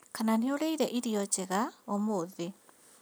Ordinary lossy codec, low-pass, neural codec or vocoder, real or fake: none; none; none; real